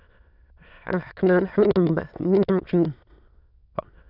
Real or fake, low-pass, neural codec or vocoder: fake; 5.4 kHz; autoencoder, 22.05 kHz, a latent of 192 numbers a frame, VITS, trained on many speakers